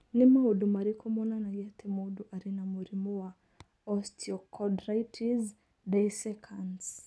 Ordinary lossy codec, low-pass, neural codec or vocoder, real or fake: none; none; none; real